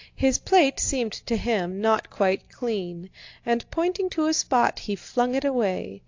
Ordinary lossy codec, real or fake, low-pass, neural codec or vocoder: AAC, 48 kbps; real; 7.2 kHz; none